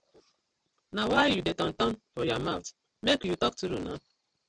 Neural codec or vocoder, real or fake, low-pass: none; real; 9.9 kHz